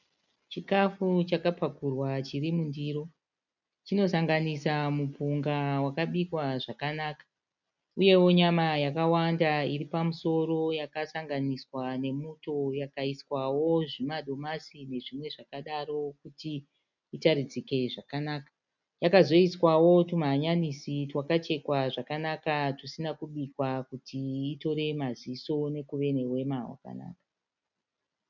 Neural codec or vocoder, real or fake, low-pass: none; real; 7.2 kHz